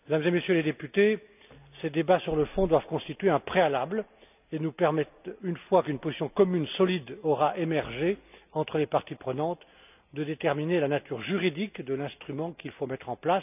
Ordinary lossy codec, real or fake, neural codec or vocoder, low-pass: none; real; none; 3.6 kHz